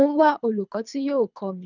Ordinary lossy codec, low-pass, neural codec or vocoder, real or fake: none; 7.2 kHz; codec, 24 kHz, 3 kbps, HILCodec; fake